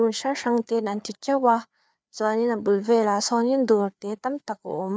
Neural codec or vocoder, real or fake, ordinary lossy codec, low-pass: codec, 16 kHz, 4 kbps, FreqCodec, larger model; fake; none; none